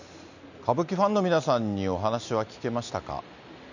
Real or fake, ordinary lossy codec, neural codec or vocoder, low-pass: real; none; none; 7.2 kHz